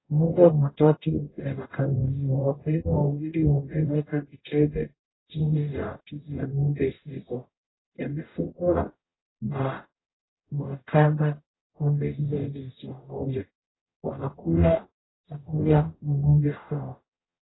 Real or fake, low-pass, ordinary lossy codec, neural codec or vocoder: fake; 7.2 kHz; AAC, 16 kbps; codec, 44.1 kHz, 0.9 kbps, DAC